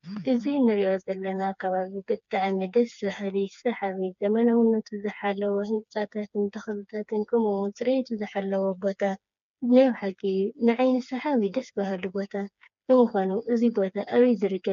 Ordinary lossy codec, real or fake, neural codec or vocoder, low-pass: MP3, 64 kbps; fake; codec, 16 kHz, 4 kbps, FreqCodec, smaller model; 7.2 kHz